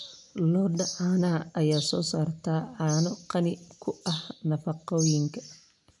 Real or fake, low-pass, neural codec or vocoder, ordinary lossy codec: real; 10.8 kHz; none; none